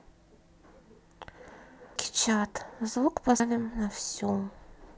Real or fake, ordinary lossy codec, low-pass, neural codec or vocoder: real; none; none; none